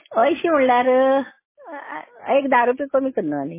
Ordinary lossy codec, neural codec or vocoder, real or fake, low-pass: MP3, 16 kbps; none; real; 3.6 kHz